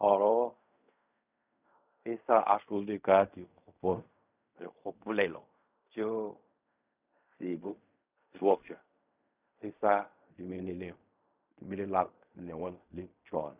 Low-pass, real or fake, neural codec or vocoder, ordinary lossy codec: 3.6 kHz; fake; codec, 16 kHz in and 24 kHz out, 0.4 kbps, LongCat-Audio-Codec, fine tuned four codebook decoder; none